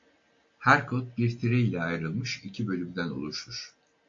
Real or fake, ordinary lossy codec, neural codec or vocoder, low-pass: real; AAC, 48 kbps; none; 7.2 kHz